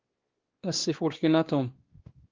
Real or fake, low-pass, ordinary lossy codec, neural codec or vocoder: fake; 7.2 kHz; Opus, 16 kbps; codec, 16 kHz, 2 kbps, X-Codec, WavLM features, trained on Multilingual LibriSpeech